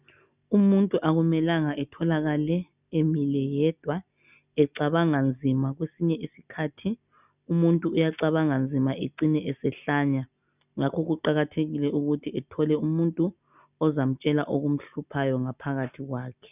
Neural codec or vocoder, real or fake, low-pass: none; real; 3.6 kHz